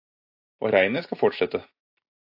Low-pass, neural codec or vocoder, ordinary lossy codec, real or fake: 5.4 kHz; vocoder, 44.1 kHz, 128 mel bands every 512 samples, BigVGAN v2; AAC, 48 kbps; fake